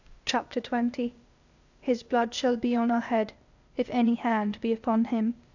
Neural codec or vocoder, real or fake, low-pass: codec, 16 kHz, 0.8 kbps, ZipCodec; fake; 7.2 kHz